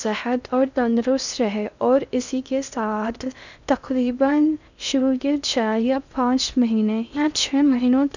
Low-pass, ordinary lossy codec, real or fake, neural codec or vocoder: 7.2 kHz; none; fake; codec, 16 kHz in and 24 kHz out, 0.6 kbps, FocalCodec, streaming, 2048 codes